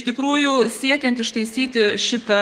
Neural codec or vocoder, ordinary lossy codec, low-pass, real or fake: codec, 32 kHz, 1.9 kbps, SNAC; Opus, 24 kbps; 14.4 kHz; fake